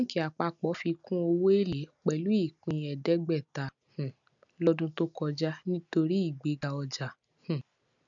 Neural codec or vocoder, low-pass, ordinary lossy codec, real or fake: none; 7.2 kHz; none; real